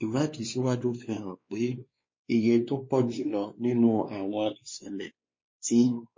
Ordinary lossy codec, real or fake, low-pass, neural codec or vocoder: MP3, 32 kbps; fake; 7.2 kHz; codec, 16 kHz, 2 kbps, X-Codec, WavLM features, trained on Multilingual LibriSpeech